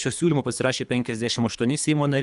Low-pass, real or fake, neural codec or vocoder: 10.8 kHz; fake; codec, 24 kHz, 3 kbps, HILCodec